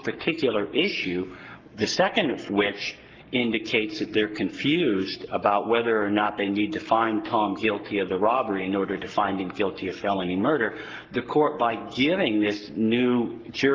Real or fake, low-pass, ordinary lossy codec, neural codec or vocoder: fake; 7.2 kHz; Opus, 24 kbps; codec, 44.1 kHz, 7.8 kbps, Pupu-Codec